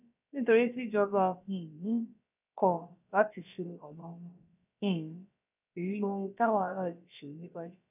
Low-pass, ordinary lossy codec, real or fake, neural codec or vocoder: 3.6 kHz; none; fake; codec, 16 kHz, about 1 kbps, DyCAST, with the encoder's durations